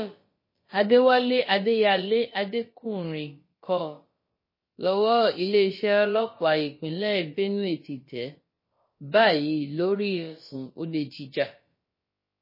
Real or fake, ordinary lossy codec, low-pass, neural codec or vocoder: fake; MP3, 24 kbps; 5.4 kHz; codec, 16 kHz, about 1 kbps, DyCAST, with the encoder's durations